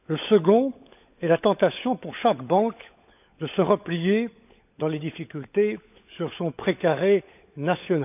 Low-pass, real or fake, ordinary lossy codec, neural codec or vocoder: 3.6 kHz; fake; none; codec, 16 kHz, 16 kbps, FunCodec, trained on LibriTTS, 50 frames a second